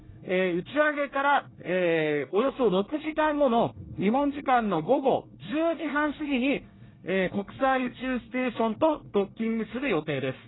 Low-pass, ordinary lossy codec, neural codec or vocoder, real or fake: 7.2 kHz; AAC, 16 kbps; codec, 24 kHz, 1 kbps, SNAC; fake